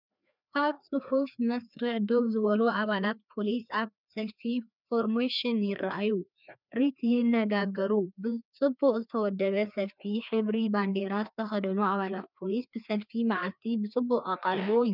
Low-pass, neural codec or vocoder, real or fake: 5.4 kHz; codec, 16 kHz, 2 kbps, FreqCodec, larger model; fake